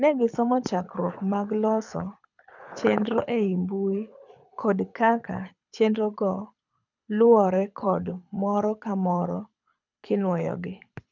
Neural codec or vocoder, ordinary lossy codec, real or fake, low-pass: codec, 24 kHz, 6 kbps, HILCodec; none; fake; 7.2 kHz